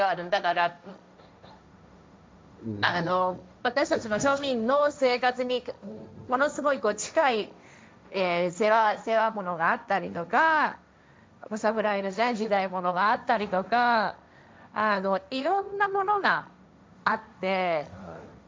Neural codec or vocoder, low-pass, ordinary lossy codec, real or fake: codec, 16 kHz, 1.1 kbps, Voila-Tokenizer; none; none; fake